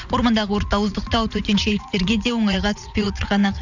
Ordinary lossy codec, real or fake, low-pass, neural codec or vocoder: none; fake; 7.2 kHz; vocoder, 44.1 kHz, 80 mel bands, Vocos